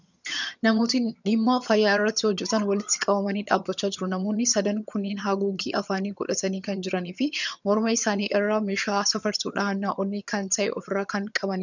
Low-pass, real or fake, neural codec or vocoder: 7.2 kHz; fake; vocoder, 22.05 kHz, 80 mel bands, HiFi-GAN